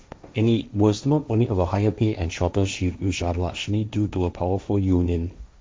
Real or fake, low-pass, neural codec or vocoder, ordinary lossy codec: fake; none; codec, 16 kHz, 1.1 kbps, Voila-Tokenizer; none